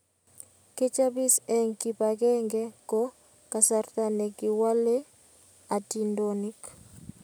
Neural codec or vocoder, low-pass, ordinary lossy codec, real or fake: none; none; none; real